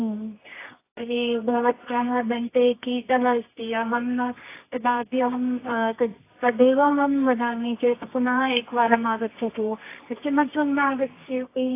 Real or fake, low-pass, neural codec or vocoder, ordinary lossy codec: fake; 3.6 kHz; codec, 24 kHz, 0.9 kbps, WavTokenizer, medium music audio release; AAC, 24 kbps